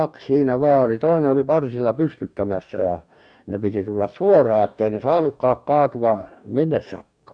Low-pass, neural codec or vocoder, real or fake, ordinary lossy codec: 9.9 kHz; codec, 44.1 kHz, 2.6 kbps, DAC; fake; none